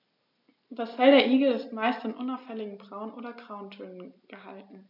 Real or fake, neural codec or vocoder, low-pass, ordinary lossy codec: real; none; 5.4 kHz; none